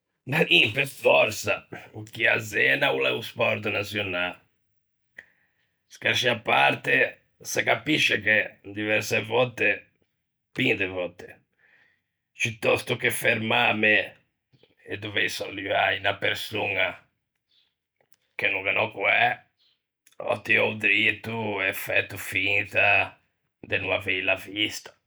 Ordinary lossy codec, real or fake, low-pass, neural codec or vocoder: none; real; none; none